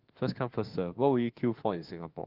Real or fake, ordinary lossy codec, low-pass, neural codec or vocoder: fake; Opus, 16 kbps; 5.4 kHz; autoencoder, 48 kHz, 32 numbers a frame, DAC-VAE, trained on Japanese speech